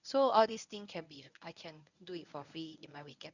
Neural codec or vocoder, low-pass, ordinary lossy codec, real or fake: codec, 24 kHz, 0.9 kbps, WavTokenizer, medium speech release version 1; 7.2 kHz; none; fake